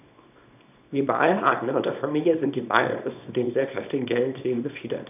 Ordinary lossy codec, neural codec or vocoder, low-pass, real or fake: none; codec, 24 kHz, 0.9 kbps, WavTokenizer, small release; 3.6 kHz; fake